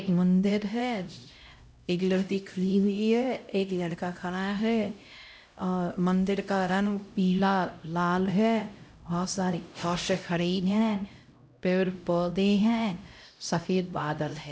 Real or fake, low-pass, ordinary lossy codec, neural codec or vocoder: fake; none; none; codec, 16 kHz, 0.5 kbps, X-Codec, HuBERT features, trained on LibriSpeech